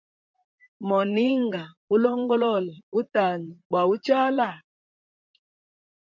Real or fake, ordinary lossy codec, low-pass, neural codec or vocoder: fake; MP3, 64 kbps; 7.2 kHz; vocoder, 44.1 kHz, 128 mel bands, Pupu-Vocoder